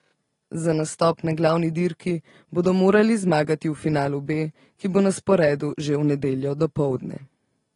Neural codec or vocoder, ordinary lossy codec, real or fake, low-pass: none; AAC, 32 kbps; real; 10.8 kHz